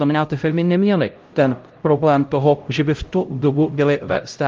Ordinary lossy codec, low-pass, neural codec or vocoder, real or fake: Opus, 32 kbps; 7.2 kHz; codec, 16 kHz, 0.5 kbps, X-Codec, HuBERT features, trained on LibriSpeech; fake